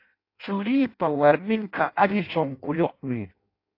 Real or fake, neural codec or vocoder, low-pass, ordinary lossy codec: fake; codec, 16 kHz in and 24 kHz out, 0.6 kbps, FireRedTTS-2 codec; 5.4 kHz; AAC, 32 kbps